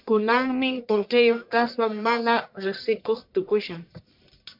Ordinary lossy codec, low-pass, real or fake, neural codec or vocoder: MP3, 48 kbps; 5.4 kHz; fake; codec, 44.1 kHz, 1.7 kbps, Pupu-Codec